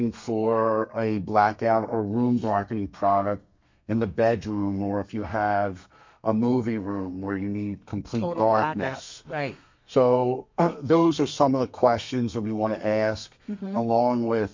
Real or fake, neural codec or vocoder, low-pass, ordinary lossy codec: fake; codec, 32 kHz, 1.9 kbps, SNAC; 7.2 kHz; MP3, 48 kbps